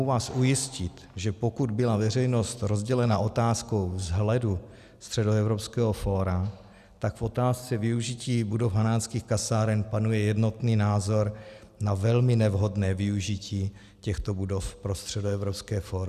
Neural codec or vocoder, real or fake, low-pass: none; real; 14.4 kHz